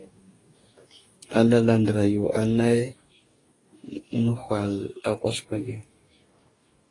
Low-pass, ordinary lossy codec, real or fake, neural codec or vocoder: 10.8 kHz; AAC, 32 kbps; fake; codec, 44.1 kHz, 2.6 kbps, DAC